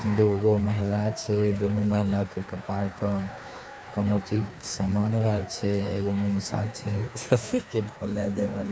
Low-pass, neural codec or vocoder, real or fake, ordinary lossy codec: none; codec, 16 kHz, 2 kbps, FreqCodec, larger model; fake; none